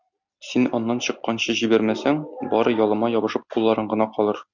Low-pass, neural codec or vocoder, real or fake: 7.2 kHz; none; real